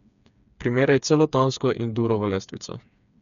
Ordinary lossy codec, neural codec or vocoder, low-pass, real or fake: none; codec, 16 kHz, 4 kbps, FreqCodec, smaller model; 7.2 kHz; fake